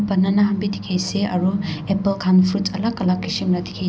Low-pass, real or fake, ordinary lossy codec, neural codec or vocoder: none; real; none; none